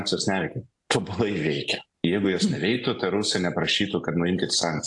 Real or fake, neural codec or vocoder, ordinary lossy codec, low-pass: real; none; AAC, 64 kbps; 10.8 kHz